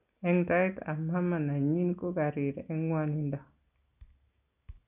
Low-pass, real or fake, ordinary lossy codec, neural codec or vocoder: 3.6 kHz; real; none; none